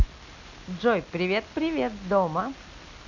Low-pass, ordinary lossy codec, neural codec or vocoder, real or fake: 7.2 kHz; none; none; real